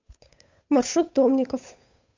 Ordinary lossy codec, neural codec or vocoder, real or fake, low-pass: MP3, 64 kbps; codec, 16 kHz, 8 kbps, FunCodec, trained on Chinese and English, 25 frames a second; fake; 7.2 kHz